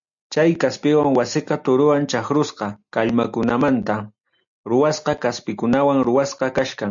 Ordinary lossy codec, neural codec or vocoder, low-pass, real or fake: MP3, 64 kbps; none; 7.2 kHz; real